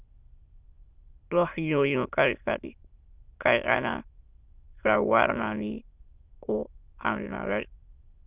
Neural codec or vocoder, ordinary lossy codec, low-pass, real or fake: autoencoder, 22.05 kHz, a latent of 192 numbers a frame, VITS, trained on many speakers; Opus, 24 kbps; 3.6 kHz; fake